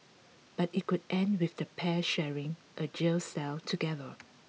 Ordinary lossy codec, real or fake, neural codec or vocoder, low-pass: none; real; none; none